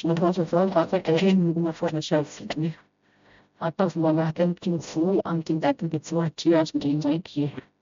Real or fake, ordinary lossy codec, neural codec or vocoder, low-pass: fake; MP3, 64 kbps; codec, 16 kHz, 0.5 kbps, FreqCodec, smaller model; 7.2 kHz